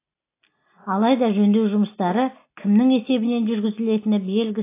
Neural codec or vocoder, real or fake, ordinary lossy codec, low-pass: none; real; AAC, 24 kbps; 3.6 kHz